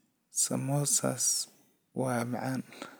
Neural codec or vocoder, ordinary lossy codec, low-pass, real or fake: none; none; none; real